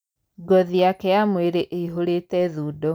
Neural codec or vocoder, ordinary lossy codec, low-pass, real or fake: none; none; none; real